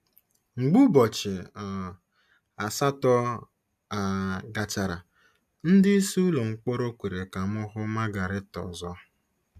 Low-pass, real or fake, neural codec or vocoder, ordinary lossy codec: 14.4 kHz; real; none; AAC, 96 kbps